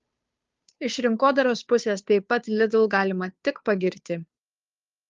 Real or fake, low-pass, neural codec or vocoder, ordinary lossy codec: fake; 7.2 kHz; codec, 16 kHz, 2 kbps, FunCodec, trained on Chinese and English, 25 frames a second; Opus, 32 kbps